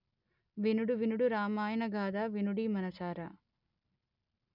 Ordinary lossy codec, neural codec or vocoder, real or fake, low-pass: none; none; real; 5.4 kHz